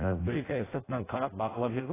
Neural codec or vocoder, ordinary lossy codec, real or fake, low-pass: codec, 16 kHz in and 24 kHz out, 0.6 kbps, FireRedTTS-2 codec; AAC, 16 kbps; fake; 3.6 kHz